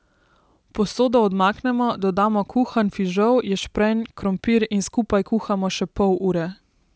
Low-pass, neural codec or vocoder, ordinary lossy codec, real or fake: none; none; none; real